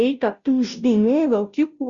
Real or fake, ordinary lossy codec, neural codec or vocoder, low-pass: fake; Opus, 64 kbps; codec, 16 kHz, 0.5 kbps, FunCodec, trained on Chinese and English, 25 frames a second; 7.2 kHz